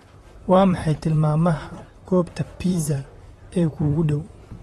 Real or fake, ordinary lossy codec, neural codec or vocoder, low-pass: fake; AAC, 32 kbps; vocoder, 44.1 kHz, 128 mel bands, Pupu-Vocoder; 19.8 kHz